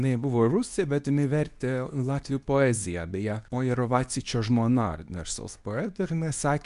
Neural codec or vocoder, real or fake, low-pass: codec, 24 kHz, 0.9 kbps, WavTokenizer, medium speech release version 2; fake; 10.8 kHz